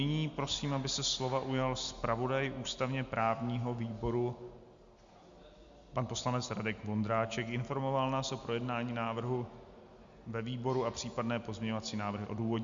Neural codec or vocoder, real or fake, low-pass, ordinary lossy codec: none; real; 7.2 kHz; Opus, 64 kbps